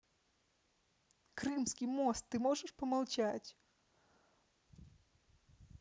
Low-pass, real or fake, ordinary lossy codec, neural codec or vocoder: none; real; none; none